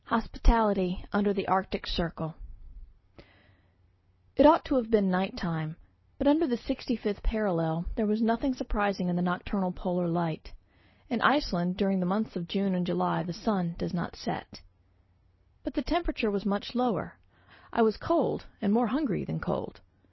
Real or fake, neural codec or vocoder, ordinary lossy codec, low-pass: real; none; MP3, 24 kbps; 7.2 kHz